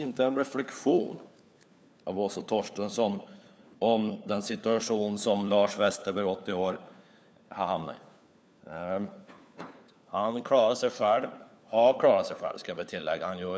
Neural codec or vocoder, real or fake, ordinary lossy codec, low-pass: codec, 16 kHz, 4 kbps, FunCodec, trained on LibriTTS, 50 frames a second; fake; none; none